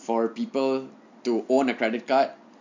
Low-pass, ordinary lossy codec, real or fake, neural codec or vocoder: 7.2 kHz; MP3, 48 kbps; real; none